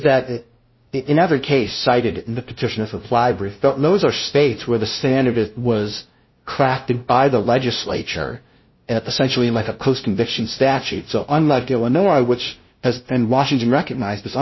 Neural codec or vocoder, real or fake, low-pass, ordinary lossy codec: codec, 16 kHz, 0.5 kbps, FunCodec, trained on LibriTTS, 25 frames a second; fake; 7.2 kHz; MP3, 24 kbps